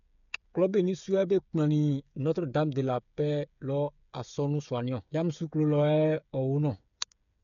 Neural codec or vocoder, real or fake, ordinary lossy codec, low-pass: codec, 16 kHz, 8 kbps, FreqCodec, smaller model; fake; none; 7.2 kHz